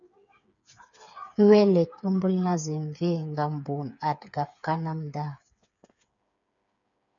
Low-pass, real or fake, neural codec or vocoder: 7.2 kHz; fake; codec, 16 kHz, 8 kbps, FreqCodec, smaller model